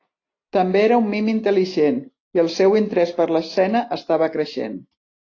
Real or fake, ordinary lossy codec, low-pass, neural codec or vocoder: real; AAC, 32 kbps; 7.2 kHz; none